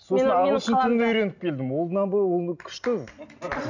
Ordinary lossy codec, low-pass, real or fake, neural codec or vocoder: none; 7.2 kHz; real; none